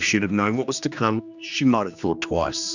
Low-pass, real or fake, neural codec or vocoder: 7.2 kHz; fake; codec, 16 kHz, 2 kbps, X-Codec, HuBERT features, trained on general audio